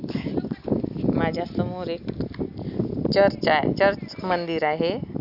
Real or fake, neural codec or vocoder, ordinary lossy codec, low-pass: real; none; none; 5.4 kHz